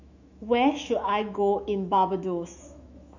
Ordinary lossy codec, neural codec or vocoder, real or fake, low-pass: none; autoencoder, 48 kHz, 128 numbers a frame, DAC-VAE, trained on Japanese speech; fake; 7.2 kHz